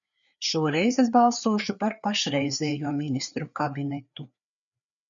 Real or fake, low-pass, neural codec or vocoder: fake; 7.2 kHz; codec, 16 kHz, 4 kbps, FreqCodec, larger model